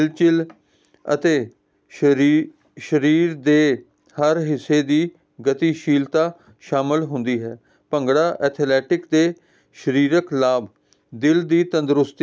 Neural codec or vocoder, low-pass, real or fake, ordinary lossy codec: none; none; real; none